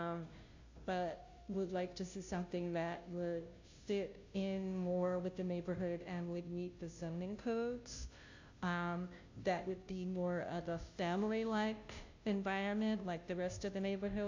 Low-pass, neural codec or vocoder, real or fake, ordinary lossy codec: 7.2 kHz; codec, 16 kHz, 0.5 kbps, FunCodec, trained on Chinese and English, 25 frames a second; fake; AAC, 48 kbps